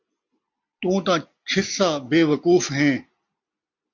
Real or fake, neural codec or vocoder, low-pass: real; none; 7.2 kHz